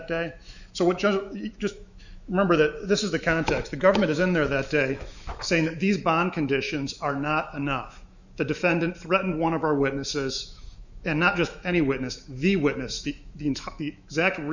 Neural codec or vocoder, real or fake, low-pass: autoencoder, 48 kHz, 128 numbers a frame, DAC-VAE, trained on Japanese speech; fake; 7.2 kHz